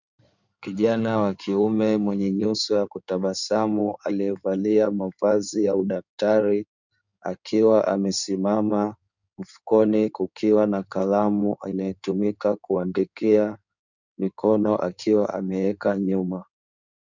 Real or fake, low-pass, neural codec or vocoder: fake; 7.2 kHz; codec, 16 kHz in and 24 kHz out, 2.2 kbps, FireRedTTS-2 codec